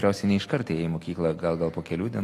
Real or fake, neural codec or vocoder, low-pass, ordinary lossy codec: real; none; 14.4 kHz; AAC, 48 kbps